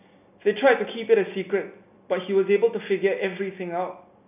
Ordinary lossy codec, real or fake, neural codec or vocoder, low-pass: none; real; none; 3.6 kHz